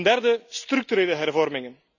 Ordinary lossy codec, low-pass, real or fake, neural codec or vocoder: none; 7.2 kHz; real; none